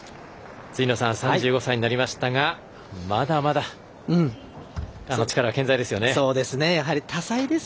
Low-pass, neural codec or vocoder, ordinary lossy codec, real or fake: none; none; none; real